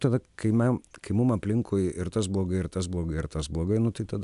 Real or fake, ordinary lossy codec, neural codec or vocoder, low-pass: real; MP3, 96 kbps; none; 10.8 kHz